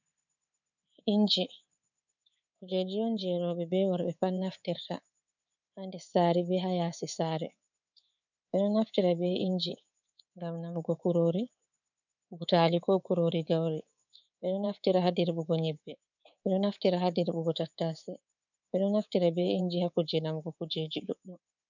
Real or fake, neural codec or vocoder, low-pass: fake; codec, 24 kHz, 3.1 kbps, DualCodec; 7.2 kHz